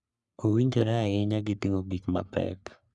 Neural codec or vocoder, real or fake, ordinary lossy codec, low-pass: codec, 44.1 kHz, 3.4 kbps, Pupu-Codec; fake; none; 10.8 kHz